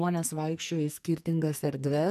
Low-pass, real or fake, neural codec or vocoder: 14.4 kHz; fake; codec, 44.1 kHz, 2.6 kbps, SNAC